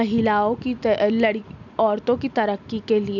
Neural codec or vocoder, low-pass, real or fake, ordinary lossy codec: none; 7.2 kHz; real; none